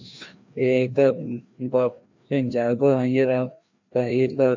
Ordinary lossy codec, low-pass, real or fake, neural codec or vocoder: MP3, 48 kbps; 7.2 kHz; fake; codec, 16 kHz, 1 kbps, FreqCodec, larger model